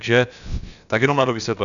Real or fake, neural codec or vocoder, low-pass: fake; codec, 16 kHz, about 1 kbps, DyCAST, with the encoder's durations; 7.2 kHz